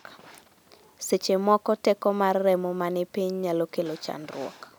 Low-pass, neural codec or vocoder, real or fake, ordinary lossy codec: none; none; real; none